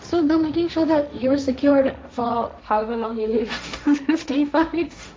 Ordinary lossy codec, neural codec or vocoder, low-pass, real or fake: none; codec, 16 kHz, 1.1 kbps, Voila-Tokenizer; none; fake